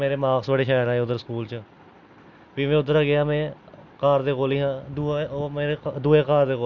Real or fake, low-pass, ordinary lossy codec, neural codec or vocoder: real; 7.2 kHz; none; none